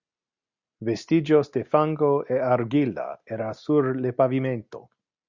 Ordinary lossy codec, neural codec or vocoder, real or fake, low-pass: Opus, 64 kbps; none; real; 7.2 kHz